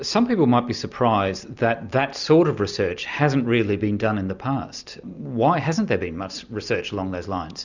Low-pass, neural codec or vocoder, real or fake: 7.2 kHz; none; real